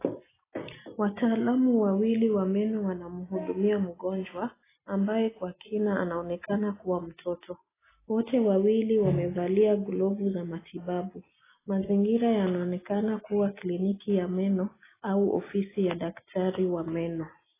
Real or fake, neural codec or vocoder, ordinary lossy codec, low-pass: real; none; AAC, 16 kbps; 3.6 kHz